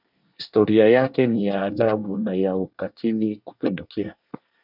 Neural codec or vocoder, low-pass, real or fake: codec, 24 kHz, 1 kbps, SNAC; 5.4 kHz; fake